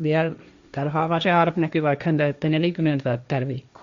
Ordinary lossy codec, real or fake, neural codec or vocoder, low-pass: Opus, 64 kbps; fake; codec, 16 kHz, 1.1 kbps, Voila-Tokenizer; 7.2 kHz